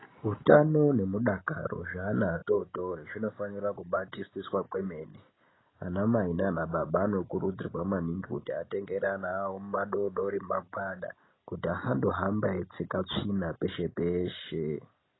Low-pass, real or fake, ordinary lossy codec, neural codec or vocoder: 7.2 kHz; real; AAC, 16 kbps; none